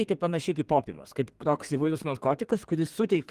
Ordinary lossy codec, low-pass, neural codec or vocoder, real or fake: Opus, 24 kbps; 14.4 kHz; codec, 32 kHz, 1.9 kbps, SNAC; fake